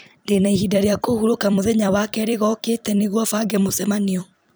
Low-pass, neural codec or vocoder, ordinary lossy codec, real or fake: none; vocoder, 44.1 kHz, 128 mel bands every 256 samples, BigVGAN v2; none; fake